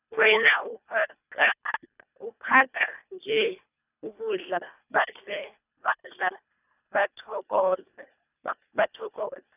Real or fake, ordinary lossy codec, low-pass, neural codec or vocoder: fake; none; 3.6 kHz; codec, 24 kHz, 1.5 kbps, HILCodec